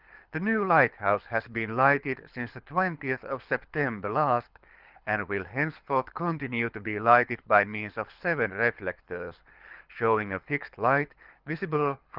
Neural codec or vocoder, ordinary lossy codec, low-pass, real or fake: codec, 24 kHz, 6 kbps, HILCodec; Opus, 32 kbps; 5.4 kHz; fake